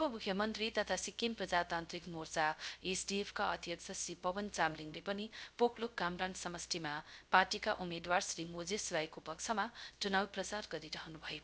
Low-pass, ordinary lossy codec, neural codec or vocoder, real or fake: none; none; codec, 16 kHz, 0.3 kbps, FocalCodec; fake